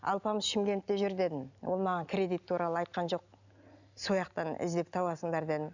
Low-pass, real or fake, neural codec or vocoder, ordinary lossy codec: 7.2 kHz; real; none; none